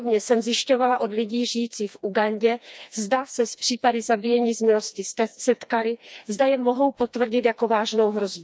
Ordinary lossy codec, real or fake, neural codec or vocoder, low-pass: none; fake; codec, 16 kHz, 2 kbps, FreqCodec, smaller model; none